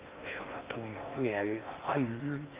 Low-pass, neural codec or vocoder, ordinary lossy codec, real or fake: 3.6 kHz; codec, 16 kHz in and 24 kHz out, 0.6 kbps, FocalCodec, streaming, 4096 codes; Opus, 32 kbps; fake